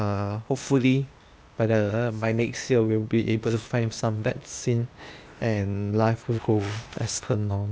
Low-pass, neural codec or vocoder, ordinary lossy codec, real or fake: none; codec, 16 kHz, 0.8 kbps, ZipCodec; none; fake